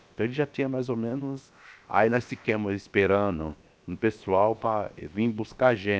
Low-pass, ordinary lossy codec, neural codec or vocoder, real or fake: none; none; codec, 16 kHz, about 1 kbps, DyCAST, with the encoder's durations; fake